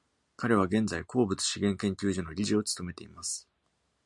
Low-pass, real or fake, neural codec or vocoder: 10.8 kHz; real; none